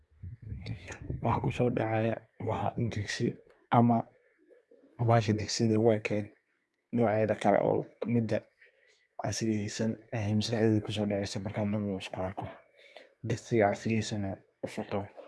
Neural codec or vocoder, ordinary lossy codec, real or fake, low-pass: codec, 24 kHz, 1 kbps, SNAC; none; fake; none